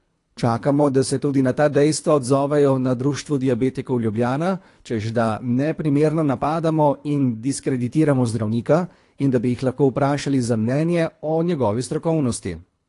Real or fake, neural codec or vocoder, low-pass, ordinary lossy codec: fake; codec, 24 kHz, 3 kbps, HILCodec; 10.8 kHz; AAC, 48 kbps